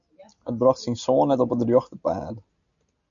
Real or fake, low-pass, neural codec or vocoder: real; 7.2 kHz; none